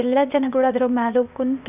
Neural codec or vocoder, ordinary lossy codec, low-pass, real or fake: codec, 16 kHz, 0.8 kbps, ZipCodec; none; 3.6 kHz; fake